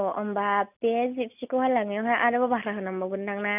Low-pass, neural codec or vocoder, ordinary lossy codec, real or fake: 3.6 kHz; none; none; real